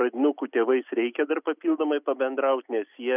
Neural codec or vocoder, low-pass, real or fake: none; 3.6 kHz; real